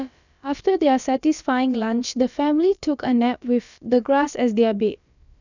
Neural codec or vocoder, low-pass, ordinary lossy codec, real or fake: codec, 16 kHz, about 1 kbps, DyCAST, with the encoder's durations; 7.2 kHz; none; fake